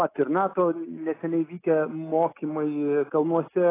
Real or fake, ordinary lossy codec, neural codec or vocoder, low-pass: real; AAC, 16 kbps; none; 3.6 kHz